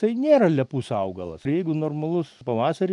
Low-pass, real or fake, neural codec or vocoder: 10.8 kHz; real; none